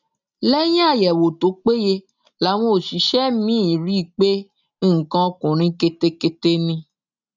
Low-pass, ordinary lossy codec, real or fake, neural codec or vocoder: 7.2 kHz; none; real; none